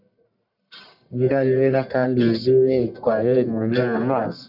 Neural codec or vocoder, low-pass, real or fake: codec, 44.1 kHz, 1.7 kbps, Pupu-Codec; 5.4 kHz; fake